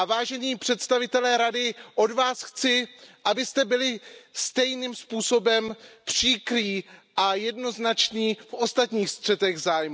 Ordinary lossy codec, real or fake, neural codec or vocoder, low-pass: none; real; none; none